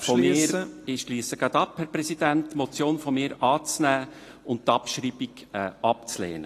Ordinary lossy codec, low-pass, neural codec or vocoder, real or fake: AAC, 48 kbps; 14.4 kHz; none; real